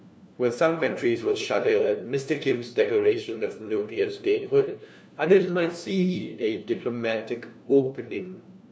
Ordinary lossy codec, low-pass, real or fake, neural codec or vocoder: none; none; fake; codec, 16 kHz, 1 kbps, FunCodec, trained on LibriTTS, 50 frames a second